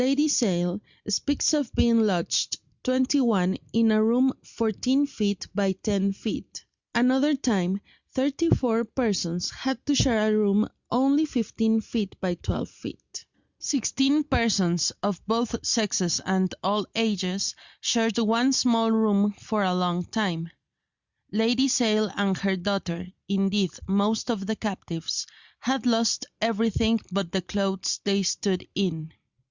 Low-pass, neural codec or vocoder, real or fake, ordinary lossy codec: 7.2 kHz; none; real; Opus, 64 kbps